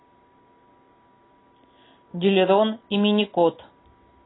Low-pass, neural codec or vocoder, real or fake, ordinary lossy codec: 7.2 kHz; none; real; AAC, 16 kbps